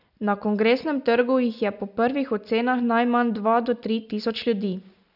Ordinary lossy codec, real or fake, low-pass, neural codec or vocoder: none; real; 5.4 kHz; none